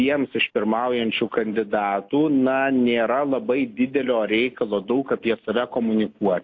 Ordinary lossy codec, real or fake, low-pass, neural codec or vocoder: AAC, 48 kbps; real; 7.2 kHz; none